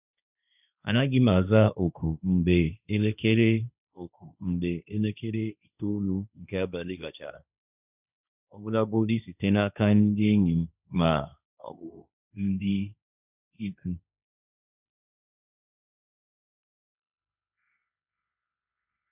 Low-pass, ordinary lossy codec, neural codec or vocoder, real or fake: 3.6 kHz; none; codec, 16 kHz, 1.1 kbps, Voila-Tokenizer; fake